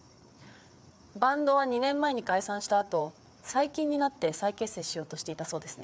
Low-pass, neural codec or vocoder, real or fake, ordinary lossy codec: none; codec, 16 kHz, 4 kbps, FreqCodec, larger model; fake; none